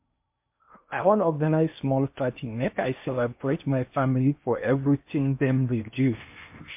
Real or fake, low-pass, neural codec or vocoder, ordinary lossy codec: fake; 3.6 kHz; codec, 16 kHz in and 24 kHz out, 0.8 kbps, FocalCodec, streaming, 65536 codes; MP3, 32 kbps